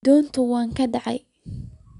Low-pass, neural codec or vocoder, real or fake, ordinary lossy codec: 10.8 kHz; none; real; none